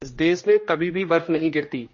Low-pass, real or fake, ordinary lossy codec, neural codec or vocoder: 7.2 kHz; fake; MP3, 32 kbps; codec, 16 kHz, 1 kbps, X-Codec, HuBERT features, trained on general audio